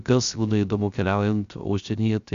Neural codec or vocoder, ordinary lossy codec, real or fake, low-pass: codec, 16 kHz, 0.3 kbps, FocalCodec; Opus, 64 kbps; fake; 7.2 kHz